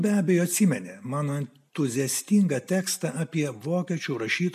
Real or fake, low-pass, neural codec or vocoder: real; 14.4 kHz; none